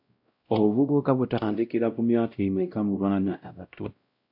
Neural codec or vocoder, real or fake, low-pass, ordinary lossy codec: codec, 16 kHz, 0.5 kbps, X-Codec, WavLM features, trained on Multilingual LibriSpeech; fake; 5.4 kHz; AAC, 48 kbps